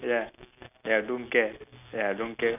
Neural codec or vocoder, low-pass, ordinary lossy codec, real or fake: none; 3.6 kHz; none; real